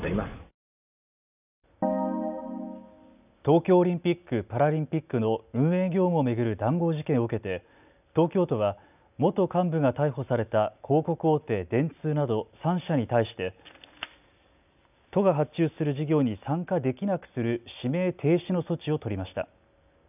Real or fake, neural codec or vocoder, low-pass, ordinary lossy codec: real; none; 3.6 kHz; none